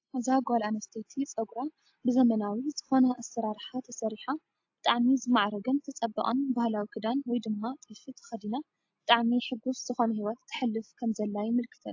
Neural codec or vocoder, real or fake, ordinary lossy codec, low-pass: none; real; AAC, 48 kbps; 7.2 kHz